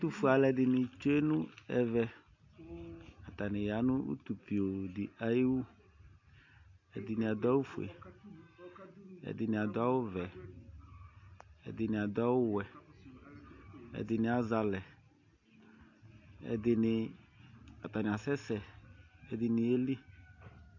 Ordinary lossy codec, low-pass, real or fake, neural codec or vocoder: AAC, 48 kbps; 7.2 kHz; real; none